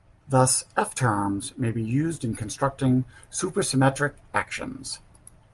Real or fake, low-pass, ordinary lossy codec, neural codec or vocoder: real; 10.8 kHz; Opus, 32 kbps; none